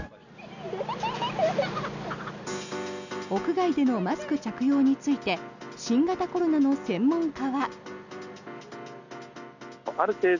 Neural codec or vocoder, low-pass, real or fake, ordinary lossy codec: none; 7.2 kHz; real; none